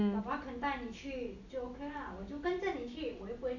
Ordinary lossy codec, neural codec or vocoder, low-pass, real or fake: none; none; 7.2 kHz; real